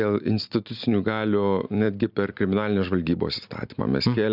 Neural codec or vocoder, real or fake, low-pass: none; real; 5.4 kHz